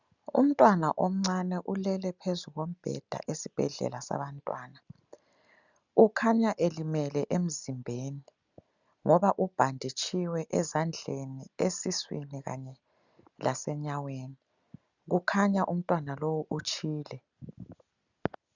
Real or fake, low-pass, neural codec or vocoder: real; 7.2 kHz; none